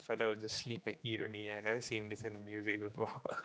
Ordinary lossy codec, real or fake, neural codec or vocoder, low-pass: none; fake; codec, 16 kHz, 2 kbps, X-Codec, HuBERT features, trained on general audio; none